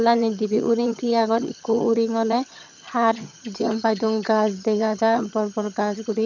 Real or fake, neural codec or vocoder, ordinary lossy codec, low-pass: fake; vocoder, 22.05 kHz, 80 mel bands, HiFi-GAN; none; 7.2 kHz